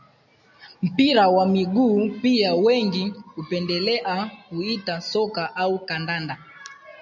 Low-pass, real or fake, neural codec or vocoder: 7.2 kHz; real; none